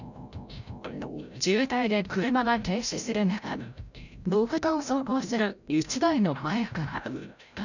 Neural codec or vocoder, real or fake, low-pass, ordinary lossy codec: codec, 16 kHz, 0.5 kbps, FreqCodec, larger model; fake; 7.2 kHz; none